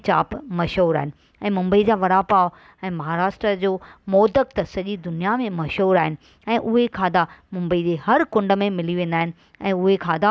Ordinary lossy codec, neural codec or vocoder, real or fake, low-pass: none; none; real; none